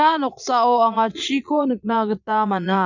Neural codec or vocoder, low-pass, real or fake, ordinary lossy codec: vocoder, 22.05 kHz, 80 mel bands, Vocos; 7.2 kHz; fake; none